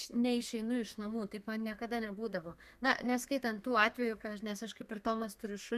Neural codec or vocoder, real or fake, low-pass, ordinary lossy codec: codec, 32 kHz, 1.9 kbps, SNAC; fake; 14.4 kHz; Opus, 64 kbps